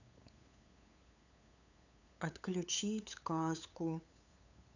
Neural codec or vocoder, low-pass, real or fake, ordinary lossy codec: codec, 16 kHz, 8 kbps, FunCodec, trained on LibriTTS, 25 frames a second; 7.2 kHz; fake; none